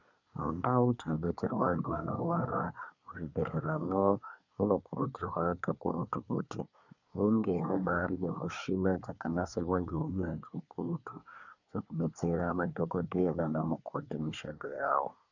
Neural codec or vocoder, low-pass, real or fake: codec, 24 kHz, 1 kbps, SNAC; 7.2 kHz; fake